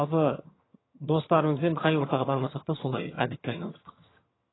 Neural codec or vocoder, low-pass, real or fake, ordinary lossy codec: vocoder, 22.05 kHz, 80 mel bands, HiFi-GAN; 7.2 kHz; fake; AAC, 16 kbps